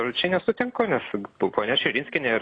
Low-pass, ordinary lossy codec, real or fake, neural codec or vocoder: 9.9 kHz; AAC, 32 kbps; real; none